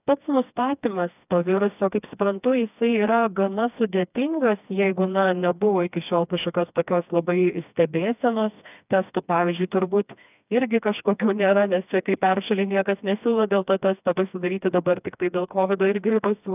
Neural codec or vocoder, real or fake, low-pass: codec, 16 kHz, 2 kbps, FreqCodec, smaller model; fake; 3.6 kHz